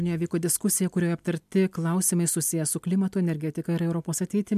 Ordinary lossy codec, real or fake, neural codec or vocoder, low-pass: MP3, 96 kbps; real; none; 14.4 kHz